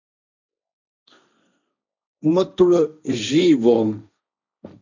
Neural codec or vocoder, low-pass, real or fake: codec, 16 kHz, 1.1 kbps, Voila-Tokenizer; 7.2 kHz; fake